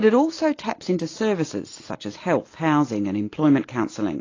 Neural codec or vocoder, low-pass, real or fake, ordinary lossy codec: none; 7.2 kHz; real; AAC, 32 kbps